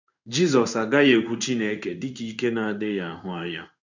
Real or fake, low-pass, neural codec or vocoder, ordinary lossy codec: fake; 7.2 kHz; codec, 16 kHz in and 24 kHz out, 1 kbps, XY-Tokenizer; none